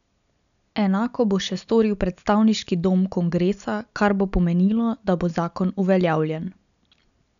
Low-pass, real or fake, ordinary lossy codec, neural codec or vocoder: 7.2 kHz; real; none; none